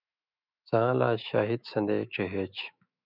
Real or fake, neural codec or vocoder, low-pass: fake; autoencoder, 48 kHz, 128 numbers a frame, DAC-VAE, trained on Japanese speech; 5.4 kHz